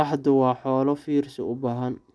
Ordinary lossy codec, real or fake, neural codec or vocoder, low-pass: none; real; none; none